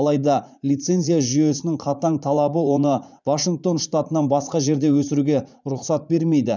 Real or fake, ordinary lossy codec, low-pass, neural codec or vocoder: fake; none; 7.2 kHz; vocoder, 44.1 kHz, 80 mel bands, Vocos